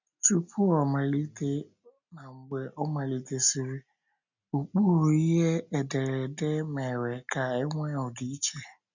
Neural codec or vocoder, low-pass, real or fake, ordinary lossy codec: none; 7.2 kHz; real; none